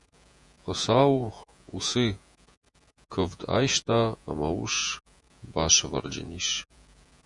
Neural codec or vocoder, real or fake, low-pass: vocoder, 48 kHz, 128 mel bands, Vocos; fake; 10.8 kHz